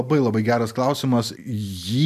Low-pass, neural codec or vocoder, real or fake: 14.4 kHz; none; real